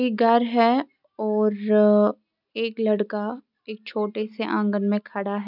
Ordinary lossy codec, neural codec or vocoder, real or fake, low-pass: none; none; real; 5.4 kHz